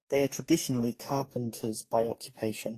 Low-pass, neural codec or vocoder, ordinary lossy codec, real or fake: 14.4 kHz; codec, 44.1 kHz, 2.6 kbps, DAC; AAC, 48 kbps; fake